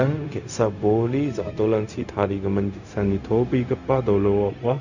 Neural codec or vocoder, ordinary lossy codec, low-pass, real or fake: codec, 16 kHz, 0.4 kbps, LongCat-Audio-Codec; none; 7.2 kHz; fake